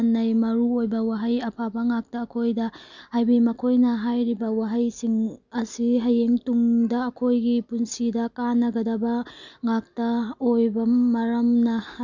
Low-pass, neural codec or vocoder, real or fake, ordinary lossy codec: 7.2 kHz; none; real; none